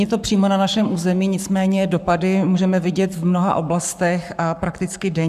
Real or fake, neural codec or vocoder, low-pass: fake; codec, 44.1 kHz, 7.8 kbps, Pupu-Codec; 14.4 kHz